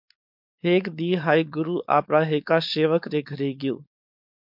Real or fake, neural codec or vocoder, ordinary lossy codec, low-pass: fake; codec, 16 kHz, 4.8 kbps, FACodec; MP3, 48 kbps; 5.4 kHz